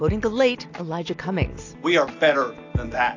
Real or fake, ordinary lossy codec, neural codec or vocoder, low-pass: real; AAC, 48 kbps; none; 7.2 kHz